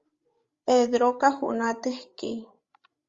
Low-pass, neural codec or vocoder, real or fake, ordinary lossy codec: 7.2 kHz; codec, 16 kHz, 16 kbps, FreqCodec, larger model; fake; Opus, 32 kbps